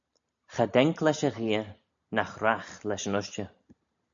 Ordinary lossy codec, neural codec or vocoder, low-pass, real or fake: MP3, 64 kbps; none; 7.2 kHz; real